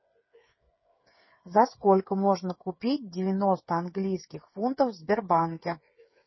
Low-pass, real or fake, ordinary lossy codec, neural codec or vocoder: 7.2 kHz; fake; MP3, 24 kbps; codec, 16 kHz, 8 kbps, FreqCodec, smaller model